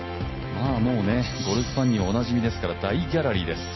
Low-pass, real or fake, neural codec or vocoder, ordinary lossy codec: 7.2 kHz; real; none; MP3, 24 kbps